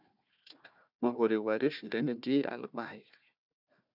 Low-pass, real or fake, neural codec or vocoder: 5.4 kHz; fake; codec, 16 kHz, 1 kbps, FunCodec, trained on Chinese and English, 50 frames a second